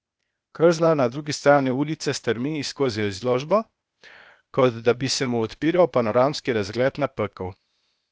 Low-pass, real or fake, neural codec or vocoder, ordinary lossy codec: none; fake; codec, 16 kHz, 0.8 kbps, ZipCodec; none